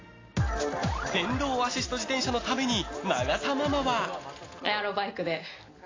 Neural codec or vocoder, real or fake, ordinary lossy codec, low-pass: none; real; AAC, 32 kbps; 7.2 kHz